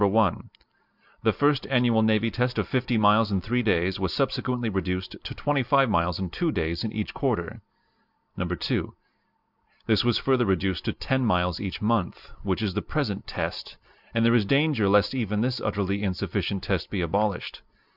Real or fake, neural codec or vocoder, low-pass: real; none; 5.4 kHz